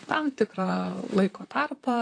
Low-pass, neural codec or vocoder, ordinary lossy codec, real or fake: 9.9 kHz; vocoder, 44.1 kHz, 128 mel bands, Pupu-Vocoder; AAC, 48 kbps; fake